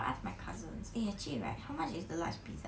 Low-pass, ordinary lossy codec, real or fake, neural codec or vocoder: none; none; real; none